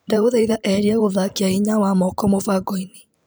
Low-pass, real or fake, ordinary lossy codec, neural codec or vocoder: none; fake; none; vocoder, 44.1 kHz, 128 mel bands every 512 samples, BigVGAN v2